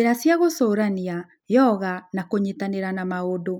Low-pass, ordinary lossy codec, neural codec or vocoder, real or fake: 19.8 kHz; none; none; real